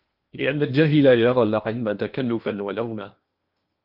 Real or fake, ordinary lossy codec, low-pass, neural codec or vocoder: fake; Opus, 24 kbps; 5.4 kHz; codec, 16 kHz in and 24 kHz out, 0.8 kbps, FocalCodec, streaming, 65536 codes